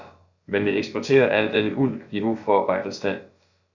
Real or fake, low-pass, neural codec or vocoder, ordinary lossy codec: fake; 7.2 kHz; codec, 16 kHz, about 1 kbps, DyCAST, with the encoder's durations; Opus, 64 kbps